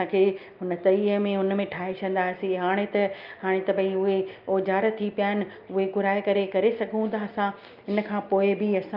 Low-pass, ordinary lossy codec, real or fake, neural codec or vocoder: 5.4 kHz; Opus, 24 kbps; real; none